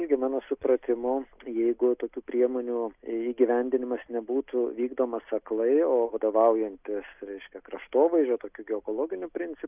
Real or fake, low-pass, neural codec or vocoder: real; 3.6 kHz; none